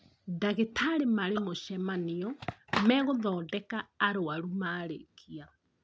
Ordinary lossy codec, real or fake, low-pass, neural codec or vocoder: none; real; none; none